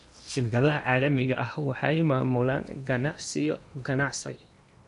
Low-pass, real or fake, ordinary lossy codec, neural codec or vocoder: 10.8 kHz; fake; none; codec, 16 kHz in and 24 kHz out, 0.8 kbps, FocalCodec, streaming, 65536 codes